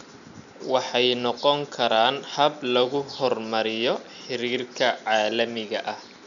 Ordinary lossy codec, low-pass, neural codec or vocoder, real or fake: none; 7.2 kHz; none; real